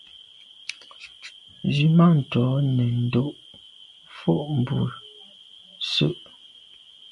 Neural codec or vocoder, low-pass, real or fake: none; 10.8 kHz; real